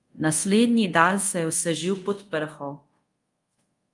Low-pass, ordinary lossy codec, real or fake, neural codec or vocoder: 10.8 kHz; Opus, 32 kbps; fake; codec, 24 kHz, 0.5 kbps, DualCodec